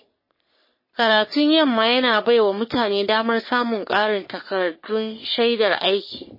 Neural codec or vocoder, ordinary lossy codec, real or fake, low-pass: codec, 44.1 kHz, 3.4 kbps, Pupu-Codec; MP3, 24 kbps; fake; 5.4 kHz